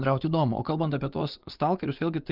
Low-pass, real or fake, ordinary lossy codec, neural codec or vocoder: 5.4 kHz; fake; Opus, 32 kbps; vocoder, 24 kHz, 100 mel bands, Vocos